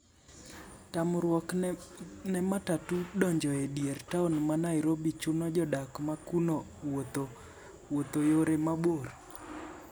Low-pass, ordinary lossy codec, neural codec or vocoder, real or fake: none; none; none; real